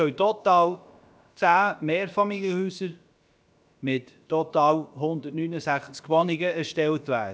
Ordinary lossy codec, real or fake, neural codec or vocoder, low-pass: none; fake; codec, 16 kHz, about 1 kbps, DyCAST, with the encoder's durations; none